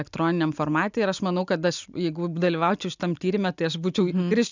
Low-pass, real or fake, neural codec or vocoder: 7.2 kHz; real; none